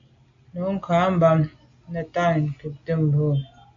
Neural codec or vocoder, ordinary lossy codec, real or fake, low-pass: none; AAC, 48 kbps; real; 7.2 kHz